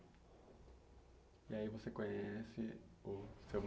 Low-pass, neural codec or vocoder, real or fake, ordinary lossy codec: none; none; real; none